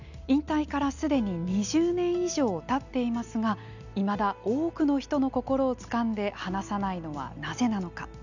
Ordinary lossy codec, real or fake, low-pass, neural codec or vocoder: none; real; 7.2 kHz; none